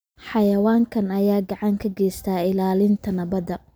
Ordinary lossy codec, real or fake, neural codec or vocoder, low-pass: none; real; none; none